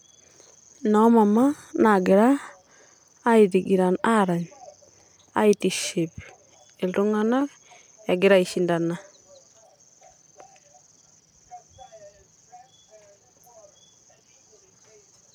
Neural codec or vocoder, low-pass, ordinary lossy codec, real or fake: none; 19.8 kHz; none; real